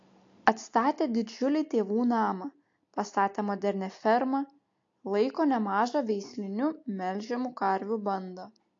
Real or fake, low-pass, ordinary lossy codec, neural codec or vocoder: real; 7.2 kHz; AAC, 48 kbps; none